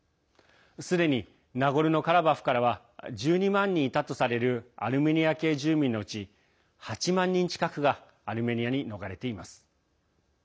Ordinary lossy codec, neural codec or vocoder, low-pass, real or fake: none; none; none; real